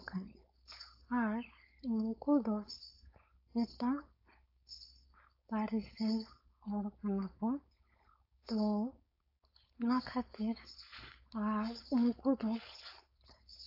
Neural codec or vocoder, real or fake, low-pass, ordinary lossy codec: codec, 16 kHz, 4.8 kbps, FACodec; fake; 5.4 kHz; AAC, 32 kbps